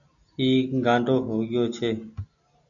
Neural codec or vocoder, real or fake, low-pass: none; real; 7.2 kHz